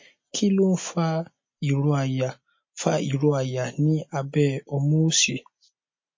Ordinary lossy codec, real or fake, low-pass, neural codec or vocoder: MP3, 32 kbps; real; 7.2 kHz; none